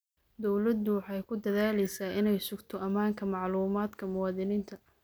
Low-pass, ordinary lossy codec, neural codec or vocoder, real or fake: none; none; none; real